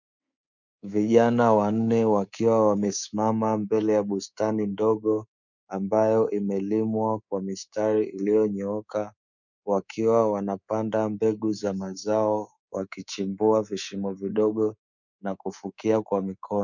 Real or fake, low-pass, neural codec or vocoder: fake; 7.2 kHz; codec, 24 kHz, 3.1 kbps, DualCodec